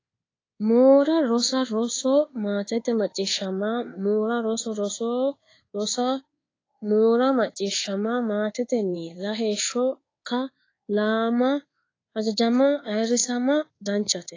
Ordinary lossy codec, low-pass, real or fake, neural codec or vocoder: AAC, 32 kbps; 7.2 kHz; fake; codec, 24 kHz, 1.2 kbps, DualCodec